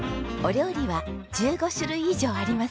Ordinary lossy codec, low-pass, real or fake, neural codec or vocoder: none; none; real; none